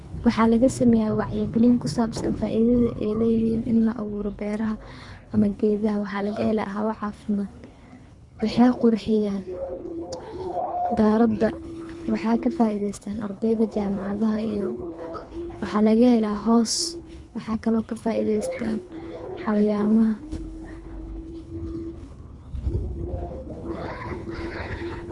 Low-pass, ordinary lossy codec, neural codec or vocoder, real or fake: 10.8 kHz; Opus, 64 kbps; codec, 24 kHz, 3 kbps, HILCodec; fake